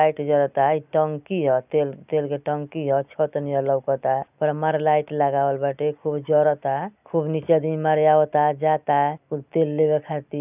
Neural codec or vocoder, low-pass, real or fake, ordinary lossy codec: none; 3.6 kHz; real; none